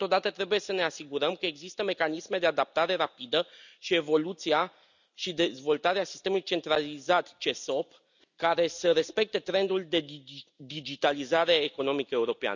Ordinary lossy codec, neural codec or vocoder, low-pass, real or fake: none; none; 7.2 kHz; real